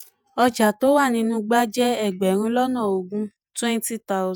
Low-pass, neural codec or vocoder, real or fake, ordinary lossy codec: none; vocoder, 48 kHz, 128 mel bands, Vocos; fake; none